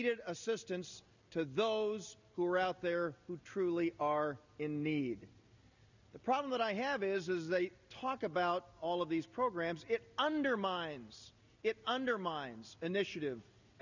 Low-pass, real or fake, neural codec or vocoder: 7.2 kHz; real; none